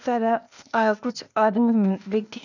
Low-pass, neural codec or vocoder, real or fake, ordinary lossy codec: 7.2 kHz; codec, 16 kHz, 0.8 kbps, ZipCodec; fake; none